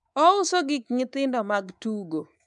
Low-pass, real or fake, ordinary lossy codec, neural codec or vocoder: 10.8 kHz; fake; none; codec, 24 kHz, 3.1 kbps, DualCodec